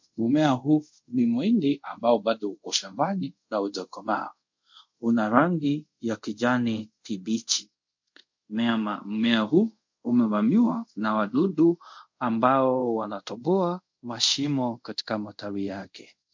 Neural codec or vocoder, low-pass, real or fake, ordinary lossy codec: codec, 24 kHz, 0.5 kbps, DualCodec; 7.2 kHz; fake; MP3, 48 kbps